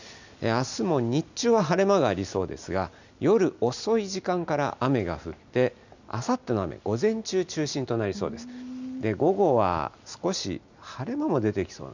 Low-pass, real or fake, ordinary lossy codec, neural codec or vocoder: 7.2 kHz; real; none; none